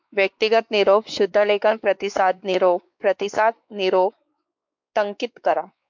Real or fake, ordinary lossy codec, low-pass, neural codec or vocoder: fake; MP3, 64 kbps; 7.2 kHz; codec, 16 kHz, 4 kbps, X-Codec, WavLM features, trained on Multilingual LibriSpeech